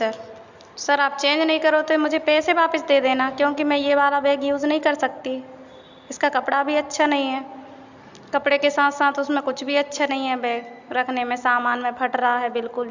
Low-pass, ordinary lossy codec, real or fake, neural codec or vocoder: 7.2 kHz; Opus, 64 kbps; real; none